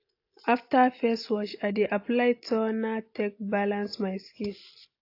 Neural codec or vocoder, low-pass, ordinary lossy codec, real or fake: none; 5.4 kHz; AAC, 32 kbps; real